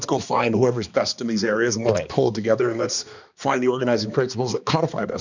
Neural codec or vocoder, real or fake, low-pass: codec, 16 kHz, 2 kbps, X-Codec, HuBERT features, trained on balanced general audio; fake; 7.2 kHz